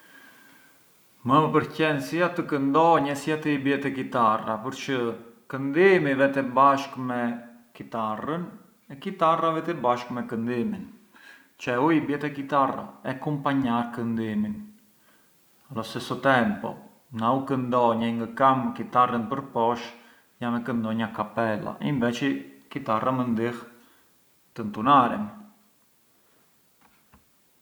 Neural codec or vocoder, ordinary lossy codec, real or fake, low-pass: none; none; real; none